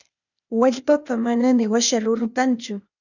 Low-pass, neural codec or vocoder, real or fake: 7.2 kHz; codec, 16 kHz, 0.8 kbps, ZipCodec; fake